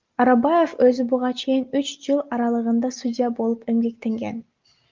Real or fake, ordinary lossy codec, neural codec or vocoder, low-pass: real; Opus, 24 kbps; none; 7.2 kHz